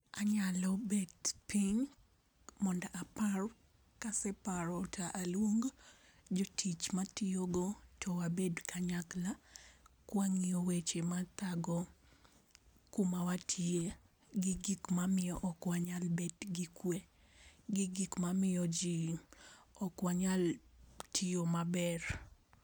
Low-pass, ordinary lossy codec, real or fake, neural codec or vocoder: none; none; real; none